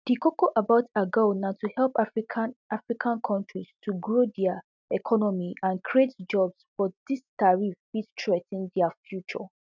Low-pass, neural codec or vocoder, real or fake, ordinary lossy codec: 7.2 kHz; none; real; none